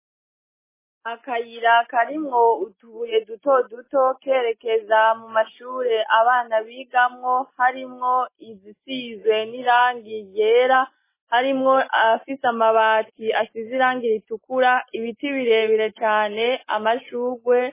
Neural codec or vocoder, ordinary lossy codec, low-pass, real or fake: none; MP3, 16 kbps; 3.6 kHz; real